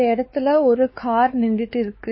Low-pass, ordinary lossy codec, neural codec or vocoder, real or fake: 7.2 kHz; MP3, 24 kbps; codec, 16 kHz, 4 kbps, X-Codec, WavLM features, trained on Multilingual LibriSpeech; fake